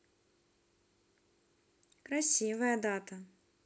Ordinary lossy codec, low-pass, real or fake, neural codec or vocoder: none; none; real; none